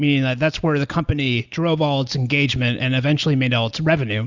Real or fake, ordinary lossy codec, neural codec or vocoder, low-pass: real; Opus, 64 kbps; none; 7.2 kHz